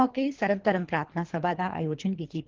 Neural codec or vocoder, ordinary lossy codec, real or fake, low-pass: codec, 24 kHz, 3 kbps, HILCodec; Opus, 16 kbps; fake; 7.2 kHz